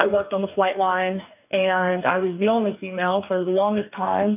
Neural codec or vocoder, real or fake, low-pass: codec, 44.1 kHz, 2.6 kbps, DAC; fake; 3.6 kHz